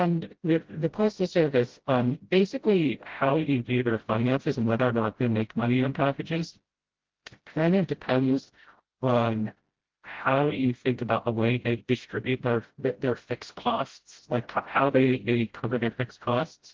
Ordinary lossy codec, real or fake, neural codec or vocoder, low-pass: Opus, 16 kbps; fake; codec, 16 kHz, 0.5 kbps, FreqCodec, smaller model; 7.2 kHz